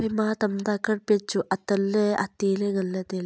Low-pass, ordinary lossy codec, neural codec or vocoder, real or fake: none; none; none; real